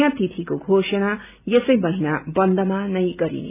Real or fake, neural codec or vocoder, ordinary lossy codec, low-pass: real; none; none; 3.6 kHz